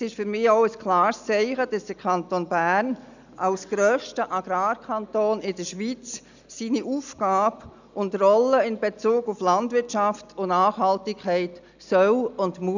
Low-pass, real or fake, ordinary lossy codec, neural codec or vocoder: 7.2 kHz; real; none; none